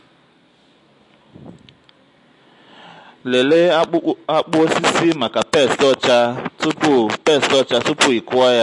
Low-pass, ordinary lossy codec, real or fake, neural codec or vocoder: 10.8 kHz; MP3, 48 kbps; real; none